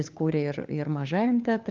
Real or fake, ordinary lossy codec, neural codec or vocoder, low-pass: fake; Opus, 24 kbps; codec, 16 kHz, 4 kbps, FunCodec, trained on LibriTTS, 50 frames a second; 7.2 kHz